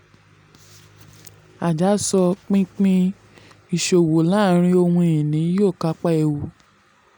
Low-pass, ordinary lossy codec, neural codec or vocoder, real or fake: 19.8 kHz; none; none; real